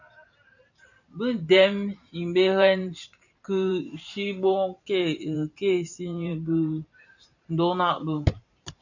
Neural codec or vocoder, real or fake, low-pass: vocoder, 44.1 kHz, 128 mel bands every 512 samples, BigVGAN v2; fake; 7.2 kHz